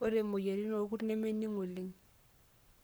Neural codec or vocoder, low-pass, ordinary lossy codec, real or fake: codec, 44.1 kHz, 7.8 kbps, Pupu-Codec; none; none; fake